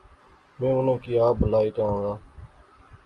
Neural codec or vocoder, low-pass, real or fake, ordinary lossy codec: none; 10.8 kHz; real; Opus, 32 kbps